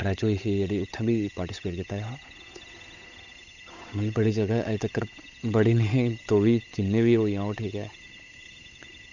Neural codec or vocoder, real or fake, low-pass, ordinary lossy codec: codec, 16 kHz, 8 kbps, FunCodec, trained on Chinese and English, 25 frames a second; fake; 7.2 kHz; none